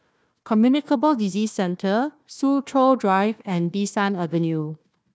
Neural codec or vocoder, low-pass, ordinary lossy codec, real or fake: codec, 16 kHz, 1 kbps, FunCodec, trained on Chinese and English, 50 frames a second; none; none; fake